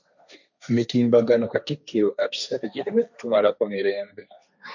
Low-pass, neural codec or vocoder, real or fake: 7.2 kHz; codec, 16 kHz, 1.1 kbps, Voila-Tokenizer; fake